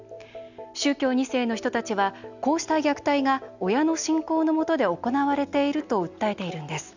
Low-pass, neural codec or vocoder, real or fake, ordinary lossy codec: 7.2 kHz; none; real; none